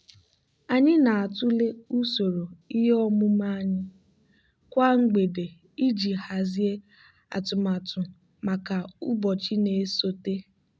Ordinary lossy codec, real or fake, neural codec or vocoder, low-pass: none; real; none; none